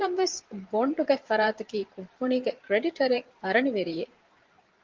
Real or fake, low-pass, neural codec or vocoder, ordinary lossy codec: fake; 7.2 kHz; vocoder, 44.1 kHz, 128 mel bands every 512 samples, BigVGAN v2; Opus, 32 kbps